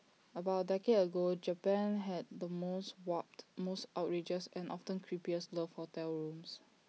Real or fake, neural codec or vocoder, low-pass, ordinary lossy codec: real; none; none; none